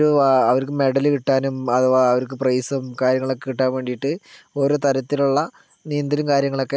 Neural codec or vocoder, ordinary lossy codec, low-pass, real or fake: none; none; none; real